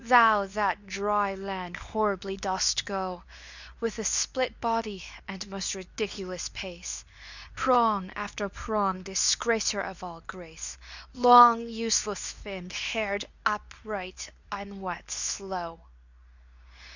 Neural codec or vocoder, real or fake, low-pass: codec, 24 kHz, 0.9 kbps, WavTokenizer, medium speech release version 2; fake; 7.2 kHz